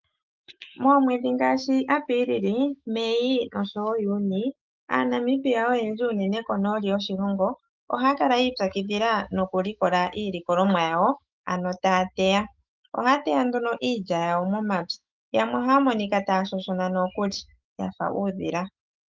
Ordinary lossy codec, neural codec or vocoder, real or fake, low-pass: Opus, 24 kbps; autoencoder, 48 kHz, 128 numbers a frame, DAC-VAE, trained on Japanese speech; fake; 7.2 kHz